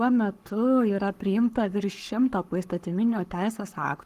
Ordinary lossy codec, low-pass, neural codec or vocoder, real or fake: Opus, 24 kbps; 14.4 kHz; codec, 44.1 kHz, 7.8 kbps, Pupu-Codec; fake